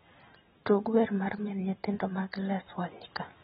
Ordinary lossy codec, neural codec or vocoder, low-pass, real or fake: AAC, 16 kbps; none; 19.8 kHz; real